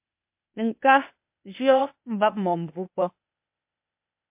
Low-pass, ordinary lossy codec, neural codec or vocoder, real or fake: 3.6 kHz; MP3, 32 kbps; codec, 16 kHz, 0.8 kbps, ZipCodec; fake